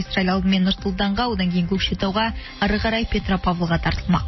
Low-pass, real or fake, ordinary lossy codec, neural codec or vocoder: 7.2 kHz; real; MP3, 24 kbps; none